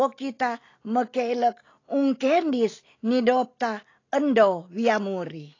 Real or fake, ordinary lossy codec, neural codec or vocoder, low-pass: real; AAC, 32 kbps; none; 7.2 kHz